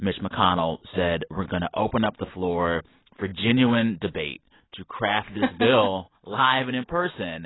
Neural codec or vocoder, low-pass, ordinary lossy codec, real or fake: none; 7.2 kHz; AAC, 16 kbps; real